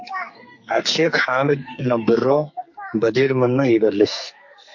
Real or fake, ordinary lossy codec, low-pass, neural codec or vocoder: fake; MP3, 48 kbps; 7.2 kHz; codec, 44.1 kHz, 2.6 kbps, SNAC